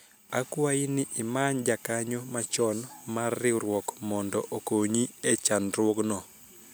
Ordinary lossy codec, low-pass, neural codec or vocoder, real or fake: none; none; none; real